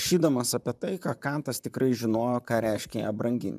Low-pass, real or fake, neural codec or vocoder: 14.4 kHz; fake; vocoder, 44.1 kHz, 128 mel bands, Pupu-Vocoder